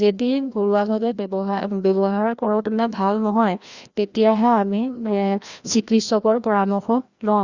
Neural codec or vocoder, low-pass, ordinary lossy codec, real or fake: codec, 16 kHz, 1 kbps, FreqCodec, larger model; 7.2 kHz; Opus, 64 kbps; fake